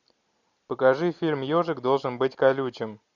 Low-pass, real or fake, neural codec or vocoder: 7.2 kHz; real; none